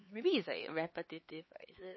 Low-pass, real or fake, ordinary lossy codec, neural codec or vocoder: 7.2 kHz; fake; MP3, 24 kbps; codec, 16 kHz, 4 kbps, X-Codec, HuBERT features, trained on LibriSpeech